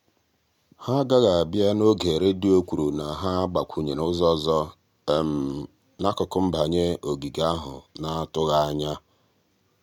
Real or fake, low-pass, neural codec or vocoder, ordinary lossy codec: real; 19.8 kHz; none; none